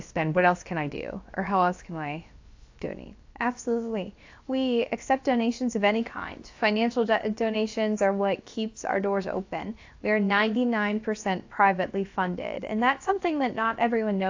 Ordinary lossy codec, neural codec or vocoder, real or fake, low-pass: AAC, 48 kbps; codec, 16 kHz, about 1 kbps, DyCAST, with the encoder's durations; fake; 7.2 kHz